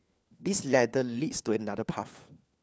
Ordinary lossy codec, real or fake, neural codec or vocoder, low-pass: none; fake; codec, 16 kHz, 4 kbps, FunCodec, trained on LibriTTS, 50 frames a second; none